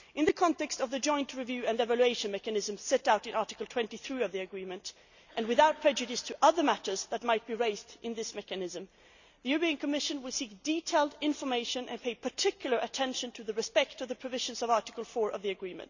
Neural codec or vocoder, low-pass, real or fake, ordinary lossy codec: none; 7.2 kHz; real; none